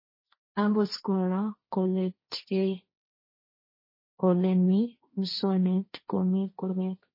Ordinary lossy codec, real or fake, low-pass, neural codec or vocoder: MP3, 24 kbps; fake; 5.4 kHz; codec, 16 kHz, 1.1 kbps, Voila-Tokenizer